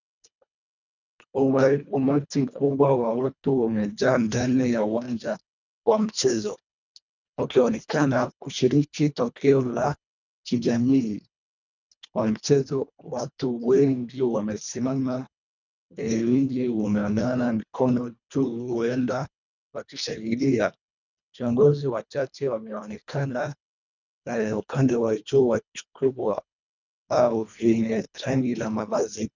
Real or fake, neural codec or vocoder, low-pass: fake; codec, 24 kHz, 1.5 kbps, HILCodec; 7.2 kHz